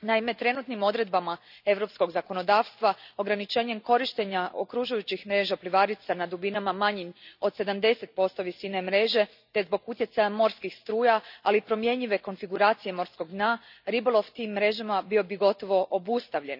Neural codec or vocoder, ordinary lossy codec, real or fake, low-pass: none; none; real; 5.4 kHz